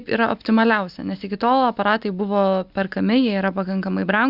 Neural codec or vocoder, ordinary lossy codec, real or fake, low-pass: none; Opus, 64 kbps; real; 5.4 kHz